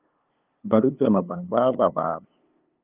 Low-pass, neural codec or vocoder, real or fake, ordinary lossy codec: 3.6 kHz; codec, 16 kHz, 2 kbps, FunCodec, trained on LibriTTS, 25 frames a second; fake; Opus, 24 kbps